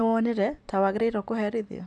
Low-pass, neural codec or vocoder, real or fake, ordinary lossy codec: 10.8 kHz; vocoder, 44.1 kHz, 128 mel bands every 256 samples, BigVGAN v2; fake; MP3, 96 kbps